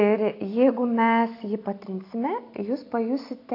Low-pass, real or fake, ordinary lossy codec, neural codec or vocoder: 5.4 kHz; real; AAC, 32 kbps; none